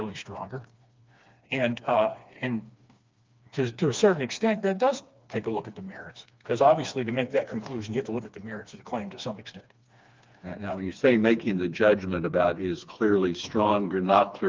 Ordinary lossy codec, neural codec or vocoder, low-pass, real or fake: Opus, 24 kbps; codec, 16 kHz, 2 kbps, FreqCodec, smaller model; 7.2 kHz; fake